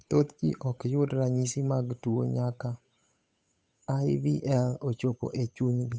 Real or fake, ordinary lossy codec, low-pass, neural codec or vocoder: fake; none; none; codec, 16 kHz, 8 kbps, FunCodec, trained on Chinese and English, 25 frames a second